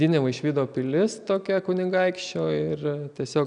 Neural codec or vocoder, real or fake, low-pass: none; real; 9.9 kHz